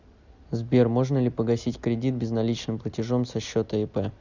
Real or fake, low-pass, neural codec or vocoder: real; 7.2 kHz; none